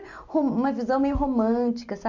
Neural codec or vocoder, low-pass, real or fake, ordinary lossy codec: none; 7.2 kHz; real; none